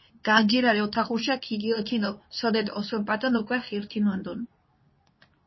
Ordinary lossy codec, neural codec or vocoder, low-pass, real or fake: MP3, 24 kbps; codec, 24 kHz, 0.9 kbps, WavTokenizer, medium speech release version 2; 7.2 kHz; fake